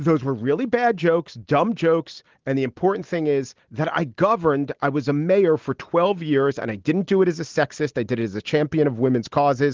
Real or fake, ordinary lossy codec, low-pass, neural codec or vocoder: real; Opus, 16 kbps; 7.2 kHz; none